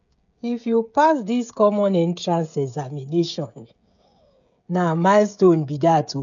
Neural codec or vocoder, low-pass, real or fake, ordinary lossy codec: codec, 16 kHz, 16 kbps, FreqCodec, smaller model; 7.2 kHz; fake; none